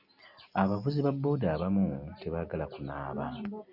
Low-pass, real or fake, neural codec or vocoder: 5.4 kHz; real; none